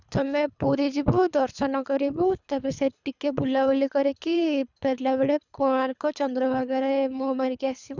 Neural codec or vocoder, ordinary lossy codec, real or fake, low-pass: codec, 24 kHz, 3 kbps, HILCodec; none; fake; 7.2 kHz